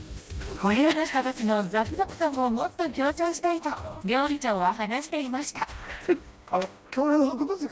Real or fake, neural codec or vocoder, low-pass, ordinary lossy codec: fake; codec, 16 kHz, 1 kbps, FreqCodec, smaller model; none; none